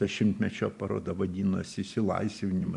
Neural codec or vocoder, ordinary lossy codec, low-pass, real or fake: vocoder, 44.1 kHz, 128 mel bands every 512 samples, BigVGAN v2; AAC, 64 kbps; 10.8 kHz; fake